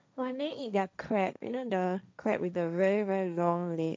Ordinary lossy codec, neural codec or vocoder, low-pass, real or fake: none; codec, 16 kHz, 1.1 kbps, Voila-Tokenizer; none; fake